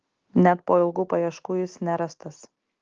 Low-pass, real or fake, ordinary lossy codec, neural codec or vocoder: 7.2 kHz; real; Opus, 16 kbps; none